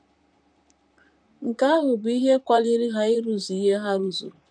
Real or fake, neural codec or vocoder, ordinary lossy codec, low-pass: fake; vocoder, 44.1 kHz, 128 mel bands, Pupu-Vocoder; none; 9.9 kHz